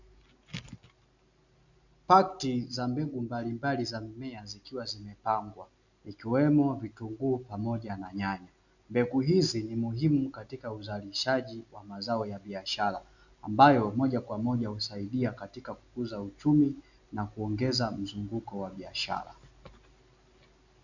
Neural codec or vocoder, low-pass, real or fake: none; 7.2 kHz; real